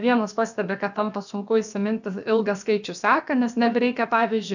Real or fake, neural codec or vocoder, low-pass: fake; codec, 16 kHz, about 1 kbps, DyCAST, with the encoder's durations; 7.2 kHz